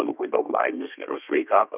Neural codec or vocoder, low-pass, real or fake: codec, 32 kHz, 1.9 kbps, SNAC; 3.6 kHz; fake